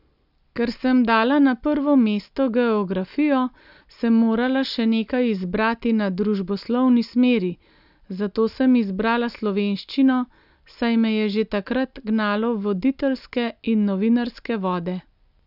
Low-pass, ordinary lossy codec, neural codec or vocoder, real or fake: 5.4 kHz; none; none; real